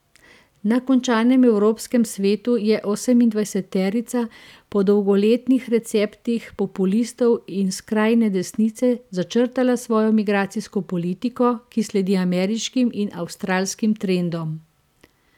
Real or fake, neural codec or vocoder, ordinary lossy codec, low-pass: real; none; none; 19.8 kHz